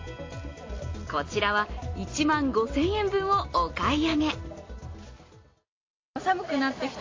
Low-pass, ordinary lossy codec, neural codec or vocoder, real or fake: 7.2 kHz; AAC, 32 kbps; none; real